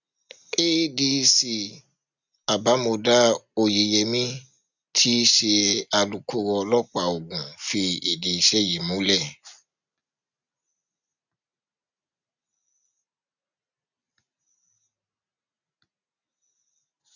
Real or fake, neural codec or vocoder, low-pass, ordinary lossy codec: real; none; 7.2 kHz; none